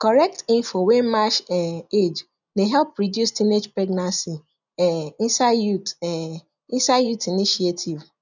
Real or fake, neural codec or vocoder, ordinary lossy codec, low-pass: real; none; none; 7.2 kHz